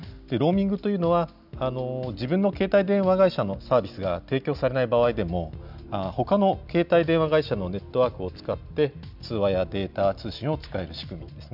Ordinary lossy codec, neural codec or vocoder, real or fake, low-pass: none; none; real; 5.4 kHz